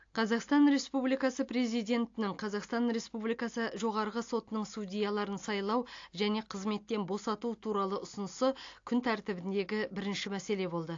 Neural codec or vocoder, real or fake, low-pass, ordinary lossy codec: none; real; 7.2 kHz; AAC, 48 kbps